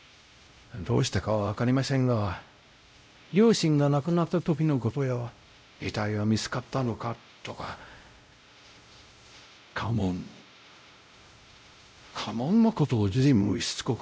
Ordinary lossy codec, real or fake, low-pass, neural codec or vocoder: none; fake; none; codec, 16 kHz, 0.5 kbps, X-Codec, WavLM features, trained on Multilingual LibriSpeech